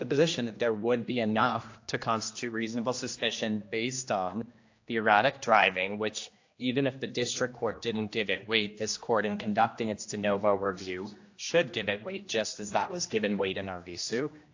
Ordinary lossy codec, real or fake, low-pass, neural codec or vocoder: AAC, 48 kbps; fake; 7.2 kHz; codec, 16 kHz, 1 kbps, X-Codec, HuBERT features, trained on general audio